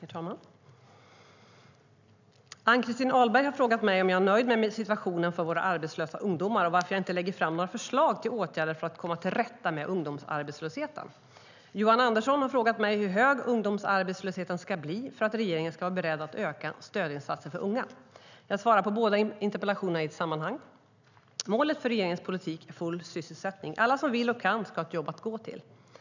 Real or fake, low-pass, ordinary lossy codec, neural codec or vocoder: real; 7.2 kHz; none; none